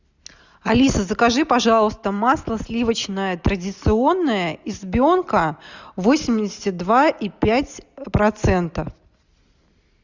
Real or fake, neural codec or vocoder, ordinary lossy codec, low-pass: real; none; Opus, 64 kbps; 7.2 kHz